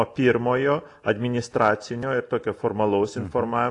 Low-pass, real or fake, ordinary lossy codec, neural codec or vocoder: 10.8 kHz; real; AAC, 48 kbps; none